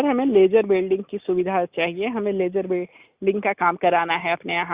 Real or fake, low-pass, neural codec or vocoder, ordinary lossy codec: real; 3.6 kHz; none; Opus, 64 kbps